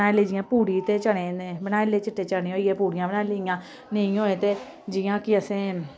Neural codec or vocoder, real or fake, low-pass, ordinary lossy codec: none; real; none; none